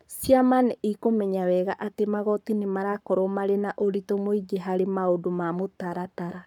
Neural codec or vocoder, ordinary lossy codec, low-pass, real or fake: codec, 44.1 kHz, 7.8 kbps, Pupu-Codec; none; 19.8 kHz; fake